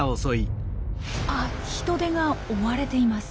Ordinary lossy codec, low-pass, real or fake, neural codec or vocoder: none; none; real; none